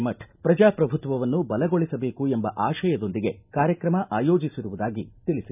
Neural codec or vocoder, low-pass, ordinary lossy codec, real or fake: none; 3.6 kHz; none; real